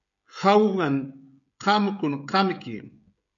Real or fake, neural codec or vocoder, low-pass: fake; codec, 16 kHz, 16 kbps, FreqCodec, smaller model; 7.2 kHz